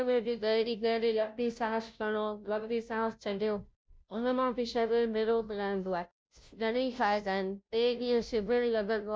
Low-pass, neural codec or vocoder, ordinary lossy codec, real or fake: none; codec, 16 kHz, 0.5 kbps, FunCodec, trained on Chinese and English, 25 frames a second; none; fake